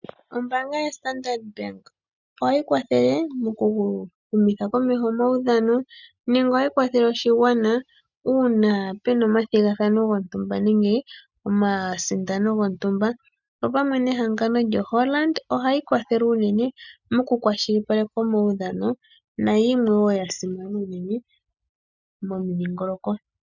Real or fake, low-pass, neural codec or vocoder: real; 7.2 kHz; none